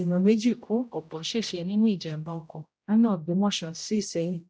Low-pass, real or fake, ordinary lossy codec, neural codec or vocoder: none; fake; none; codec, 16 kHz, 0.5 kbps, X-Codec, HuBERT features, trained on general audio